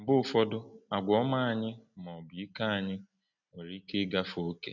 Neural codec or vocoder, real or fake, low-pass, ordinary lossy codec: none; real; 7.2 kHz; none